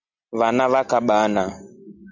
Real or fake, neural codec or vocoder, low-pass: real; none; 7.2 kHz